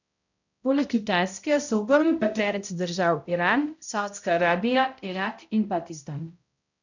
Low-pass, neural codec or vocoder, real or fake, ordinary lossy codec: 7.2 kHz; codec, 16 kHz, 0.5 kbps, X-Codec, HuBERT features, trained on balanced general audio; fake; none